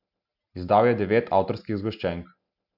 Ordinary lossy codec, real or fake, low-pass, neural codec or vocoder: none; real; 5.4 kHz; none